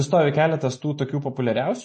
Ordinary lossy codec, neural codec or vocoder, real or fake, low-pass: MP3, 32 kbps; none; real; 10.8 kHz